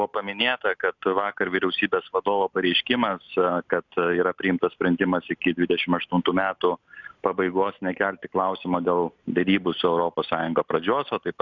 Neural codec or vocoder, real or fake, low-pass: none; real; 7.2 kHz